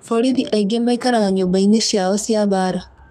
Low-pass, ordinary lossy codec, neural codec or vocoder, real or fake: 14.4 kHz; none; codec, 32 kHz, 1.9 kbps, SNAC; fake